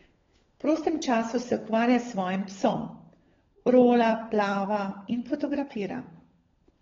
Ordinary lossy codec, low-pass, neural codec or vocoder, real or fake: AAC, 24 kbps; 7.2 kHz; codec, 16 kHz, 16 kbps, FunCodec, trained on LibriTTS, 50 frames a second; fake